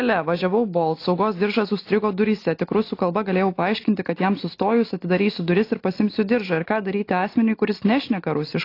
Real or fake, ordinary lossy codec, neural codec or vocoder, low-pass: real; AAC, 32 kbps; none; 5.4 kHz